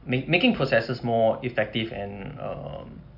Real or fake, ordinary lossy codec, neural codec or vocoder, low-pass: real; MP3, 48 kbps; none; 5.4 kHz